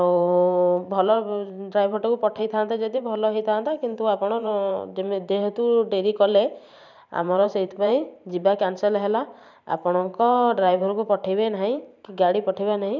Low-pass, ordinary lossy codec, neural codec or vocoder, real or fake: 7.2 kHz; none; vocoder, 44.1 kHz, 128 mel bands every 512 samples, BigVGAN v2; fake